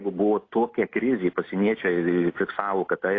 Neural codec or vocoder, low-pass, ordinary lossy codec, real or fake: none; 7.2 kHz; AAC, 32 kbps; real